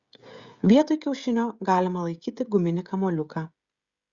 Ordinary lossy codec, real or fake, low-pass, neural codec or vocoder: Opus, 64 kbps; fake; 7.2 kHz; codec, 16 kHz, 16 kbps, FreqCodec, smaller model